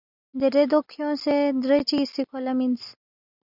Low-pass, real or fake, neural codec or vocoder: 5.4 kHz; real; none